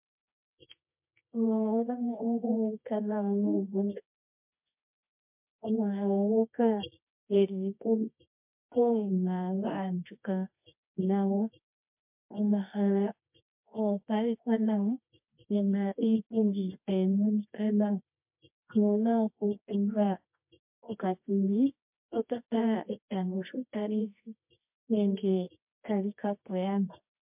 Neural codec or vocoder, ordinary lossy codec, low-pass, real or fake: codec, 24 kHz, 0.9 kbps, WavTokenizer, medium music audio release; MP3, 32 kbps; 3.6 kHz; fake